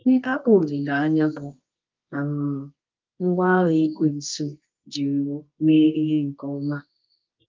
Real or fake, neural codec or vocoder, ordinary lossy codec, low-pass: fake; codec, 24 kHz, 0.9 kbps, WavTokenizer, medium music audio release; Opus, 24 kbps; 7.2 kHz